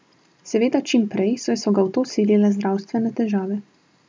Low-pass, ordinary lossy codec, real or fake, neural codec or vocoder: none; none; real; none